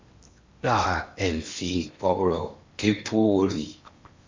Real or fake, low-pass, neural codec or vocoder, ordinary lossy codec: fake; 7.2 kHz; codec, 16 kHz in and 24 kHz out, 0.8 kbps, FocalCodec, streaming, 65536 codes; MP3, 64 kbps